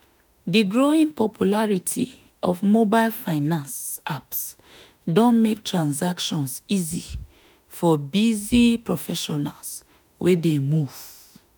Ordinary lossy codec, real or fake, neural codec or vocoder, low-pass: none; fake; autoencoder, 48 kHz, 32 numbers a frame, DAC-VAE, trained on Japanese speech; none